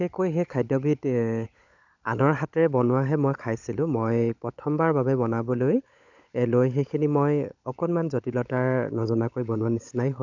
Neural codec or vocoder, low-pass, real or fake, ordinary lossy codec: codec, 16 kHz, 16 kbps, FunCodec, trained on LibriTTS, 50 frames a second; 7.2 kHz; fake; none